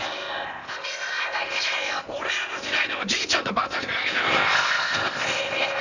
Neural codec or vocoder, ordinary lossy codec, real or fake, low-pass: codec, 16 kHz in and 24 kHz out, 0.8 kbps, FocalCodec, streaming, 65536 codes; none; fake; 7.2 kHz